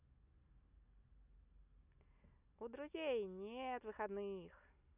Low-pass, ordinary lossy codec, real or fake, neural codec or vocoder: 3.6 kHz; none; real; none